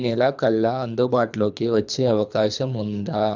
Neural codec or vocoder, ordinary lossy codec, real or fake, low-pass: codec, 24 kHz, 3 kbps, HILCodec; none; fake; 7.2 kHz